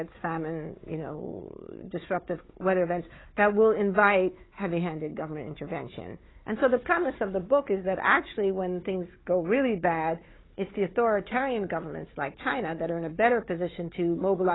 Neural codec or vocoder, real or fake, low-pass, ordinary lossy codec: codec, 16 kHz, 4 kbps, FunCodec, trained on Chinese and English, 50 frames a second; fake; 7.2 kHz; AAC, 16 kbps